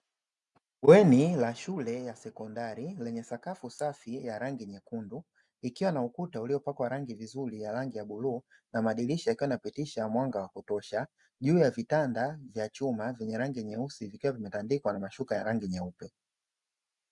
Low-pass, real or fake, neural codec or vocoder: 10.8 kHz; real; none